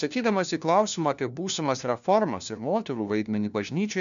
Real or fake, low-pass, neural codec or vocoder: fake; 7.2 kHz; codec, 16 kHz, 1 kbps, FunCodec, trained on LibriTTS, 50 frames a second